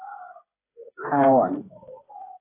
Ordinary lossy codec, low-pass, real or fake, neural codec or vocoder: AAC, 16 kbps; 3.6 kHz; fake; codec, 16 kHz, 4 kbps, FreqCodec, smaller model